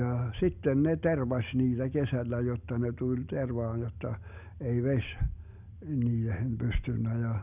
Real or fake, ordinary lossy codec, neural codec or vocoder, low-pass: real; none; none; 3.6 kHz